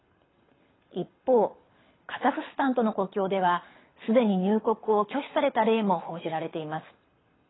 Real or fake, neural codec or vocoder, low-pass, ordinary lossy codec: fake; codec, 24 kHz, 6 kbps, HILCodec; 7.2 kHz; AAC, 16 kbps